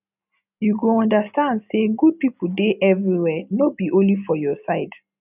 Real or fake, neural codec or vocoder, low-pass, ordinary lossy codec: real; none; 3.6 kHz; none